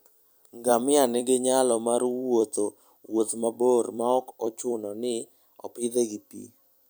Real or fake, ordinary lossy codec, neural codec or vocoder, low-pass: fake; none; vocoder, 44.1 kHz, 128 mel bands every 256 samples, BigVGAN v2; none